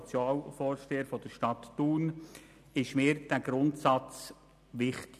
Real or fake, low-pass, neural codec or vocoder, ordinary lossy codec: real; 14.4 kHz; none; none